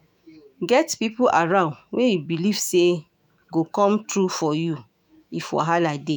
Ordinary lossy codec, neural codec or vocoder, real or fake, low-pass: none; autoencoder, 48 kHz, 128 numbers a frame, DAC-VAE, trained on Japanese speech; fake; none